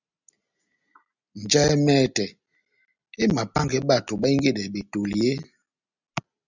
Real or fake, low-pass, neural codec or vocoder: real; 7.2 kHz; none